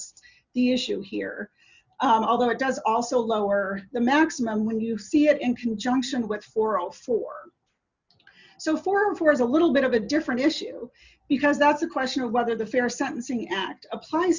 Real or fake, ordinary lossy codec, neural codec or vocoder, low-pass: real; Opus, 64 kbps; none; 7.2 kHz